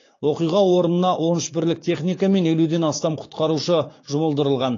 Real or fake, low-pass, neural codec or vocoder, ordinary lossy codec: fake; 7.2 kHz; codec, 16 kHz, 6 kbps, DAC; AAC, 32 kbps